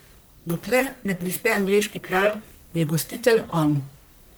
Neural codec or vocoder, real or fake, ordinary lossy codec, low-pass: codec, 44.1 kHz, 1.7 kbps, Pupu-Codec; fake; none; none